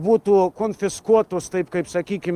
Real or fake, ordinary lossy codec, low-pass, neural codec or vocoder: real; Opus, 32 kbps; 14.4 kHz; none